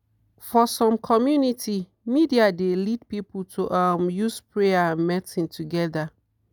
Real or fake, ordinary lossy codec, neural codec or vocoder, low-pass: real; none; none; none